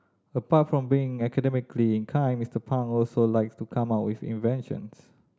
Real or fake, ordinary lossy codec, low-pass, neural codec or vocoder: real; none; none; none